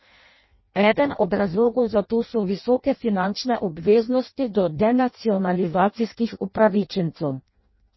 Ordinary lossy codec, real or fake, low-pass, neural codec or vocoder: MP3, 24 kbps; fake; 7.2 kHz; codec, 16 kHz in and 24 kHz out, 0.6 kbps, FireRedTTS-2 codec